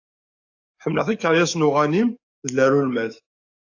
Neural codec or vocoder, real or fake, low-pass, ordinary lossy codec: codec, 44.1 kHz, 7.8 kbps, DAC; fake; 7.2 kHz; AAC, 48 kbps